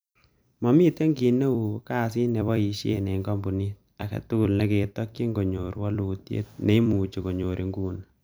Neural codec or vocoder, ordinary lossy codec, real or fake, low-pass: vocoder, 44.1 kHz, 128 mel bands every 256 samples, BigVGAN v2; none; fake; none